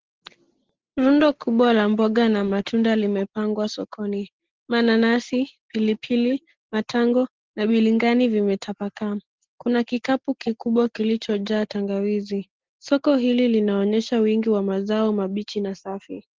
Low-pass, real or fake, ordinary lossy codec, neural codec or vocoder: 7.2 kHz; real; Opus, 16 kbps; none